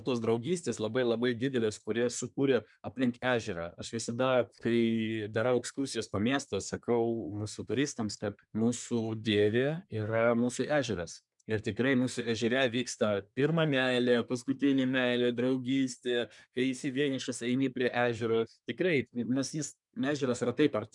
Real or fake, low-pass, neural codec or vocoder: fake; 10.8 kHz; codec, 24 kHz, 1 kbps, SNAC